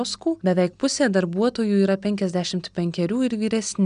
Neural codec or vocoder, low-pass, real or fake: none; 9.9 kHz; real